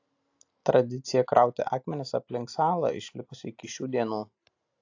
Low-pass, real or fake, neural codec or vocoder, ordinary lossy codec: 7.2 kHz; real; none; AAC, 48 kbps